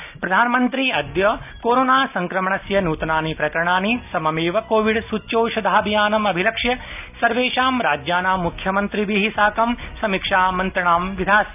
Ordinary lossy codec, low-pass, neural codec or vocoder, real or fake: none; 3.6 kHz; none; real